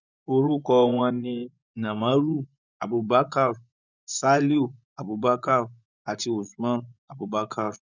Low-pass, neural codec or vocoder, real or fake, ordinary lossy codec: 7.2 kHz; vocoder, 24 kHz, 100 mel bands, Vocos; fake; none